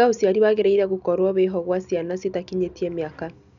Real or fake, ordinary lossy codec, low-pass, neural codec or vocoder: real; none; 7.2 kHz; none